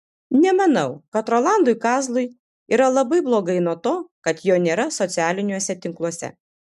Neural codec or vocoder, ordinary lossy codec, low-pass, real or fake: none; MP3, 96 kbps; 14.4 kHz; real